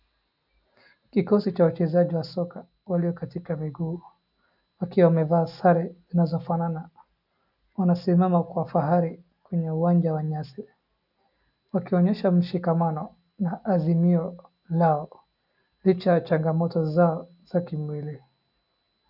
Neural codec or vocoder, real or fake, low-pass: none; real; 5.4 kHz